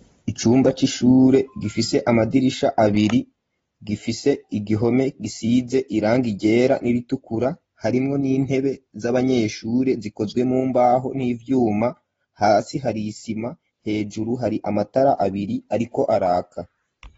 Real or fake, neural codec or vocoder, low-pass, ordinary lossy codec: real; none; 9.9 kHz; AAC, 24 kbps